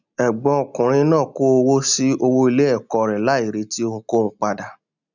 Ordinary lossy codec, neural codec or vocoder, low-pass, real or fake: none; none; 7.2 kHz; real